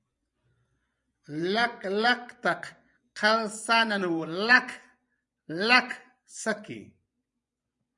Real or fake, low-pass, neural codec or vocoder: fake; 10.8 kHz; vocoder, 24 kHz, 100 mel bands, Vocos